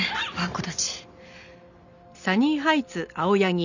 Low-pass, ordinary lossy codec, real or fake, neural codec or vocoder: 7.2 kHz; none; real; none